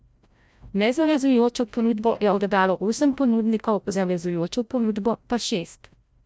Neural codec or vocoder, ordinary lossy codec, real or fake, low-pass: codec, 16 kHz, 0.5 kbps, FreqCodec, larger model; none; fake; none